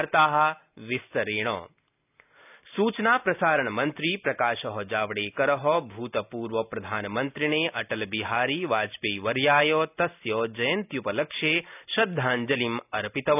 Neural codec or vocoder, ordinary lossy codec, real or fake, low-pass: none; none; real; 3.6 kHz